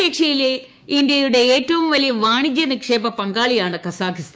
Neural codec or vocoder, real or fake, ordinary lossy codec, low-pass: codec, 16 kHz, 6 kbps, DAC; fake; none; none